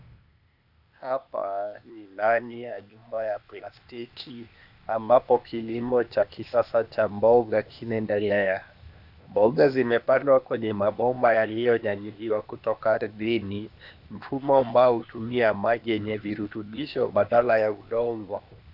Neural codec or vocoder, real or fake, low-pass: codec, 16 kHz, 0.8 kbps, ZipCodec; fake; 5.4 kHz